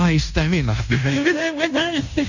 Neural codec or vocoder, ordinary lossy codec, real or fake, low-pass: codec, 16 kHz in and 24 kHz out, 0.9 kbps, LongCat-Audio-Codec, fine tuned four codebook decoder; none; fake; 7.2 kHz